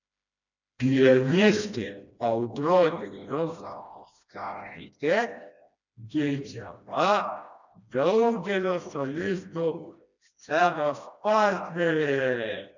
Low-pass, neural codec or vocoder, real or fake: 7.2 kHz; codec, 16 kHz, 1 kbps, FreqCodec, smaller model; fake